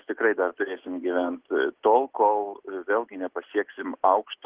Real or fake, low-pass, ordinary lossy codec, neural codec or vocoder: real; 3.6 kHz; Opus, 16 kbps; none